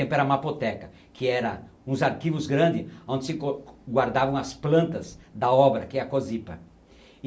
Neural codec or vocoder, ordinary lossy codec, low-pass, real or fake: none; none; none; real